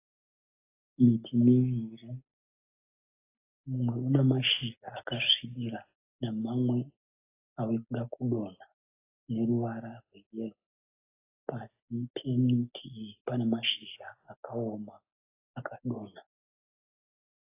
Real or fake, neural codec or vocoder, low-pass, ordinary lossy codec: real; none; 3.6 kHz; AAC, 24 kbps